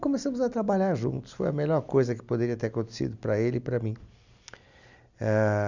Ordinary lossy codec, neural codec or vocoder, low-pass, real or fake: none; none; 7.2 kHz; real